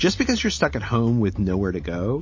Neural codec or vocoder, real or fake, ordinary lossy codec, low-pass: none; real; MP3, 32 kbps; 7.2 kHz